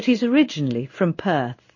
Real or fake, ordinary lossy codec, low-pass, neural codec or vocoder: fake; MP3, 32 kbps; 7.2 kHz; codec, 16 kHz in and 24 kHz out, 1 kbps, XY-Tokenizer